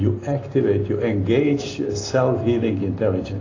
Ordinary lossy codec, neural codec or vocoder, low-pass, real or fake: AAC, 32 kbps; none; 7.2 kHz; real